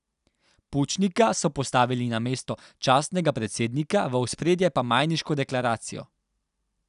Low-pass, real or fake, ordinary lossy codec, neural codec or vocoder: 10.8 kHz; real; none; none